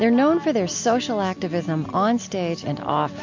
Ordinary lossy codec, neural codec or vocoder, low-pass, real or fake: MP3, 48 kbps; none; 7.2 kHz; real